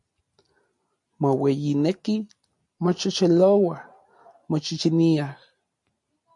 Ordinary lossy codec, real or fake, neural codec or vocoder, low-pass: MP3, 48 kbps; real; none; 10.8 kHz